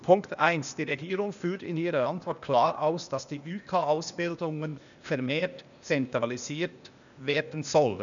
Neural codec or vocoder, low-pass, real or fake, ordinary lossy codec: codec, 16 kHz, 0.8 kbps, ZipCodec; 7.2 kHz; fake; none